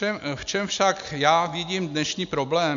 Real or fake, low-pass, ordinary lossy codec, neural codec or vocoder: real; 7.2 kHz; MP3, 64 kbps; none